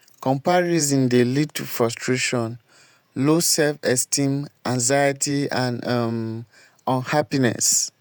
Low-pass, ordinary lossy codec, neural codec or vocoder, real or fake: none; none; vocoder, 48 kHz, 128 mel bands, Vocos; fake